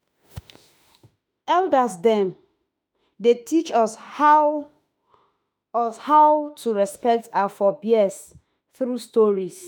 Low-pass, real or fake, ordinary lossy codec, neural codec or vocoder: none; fake; none; autoencoder, 48 kHz, 32 numbers a frame, DAC-VAE, trained on Japanese speech